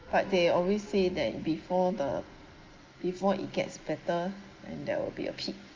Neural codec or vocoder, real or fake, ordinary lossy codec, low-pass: none; real; Opus, 32 kbps; 7.2 kHz